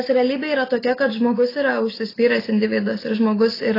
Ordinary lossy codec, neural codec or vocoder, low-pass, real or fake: AAC, 24 kbps; none; 5.4 kHz; real